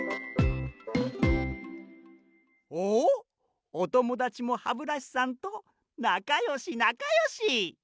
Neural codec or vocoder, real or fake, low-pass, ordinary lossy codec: none; real; none; none